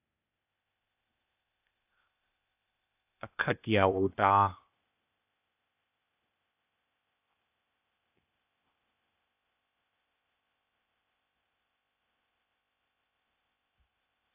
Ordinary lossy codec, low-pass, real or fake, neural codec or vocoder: AAC, 24 kbps; 3.6 kHz; fake; codec, 16 kHz, 0.8 kbps, ZipCodec